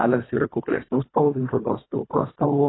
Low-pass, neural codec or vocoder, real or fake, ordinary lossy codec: 7.2 kHz; codec, 24 kHz, 1.5 kbps, HILCodec; fake; AAC, 16 kbps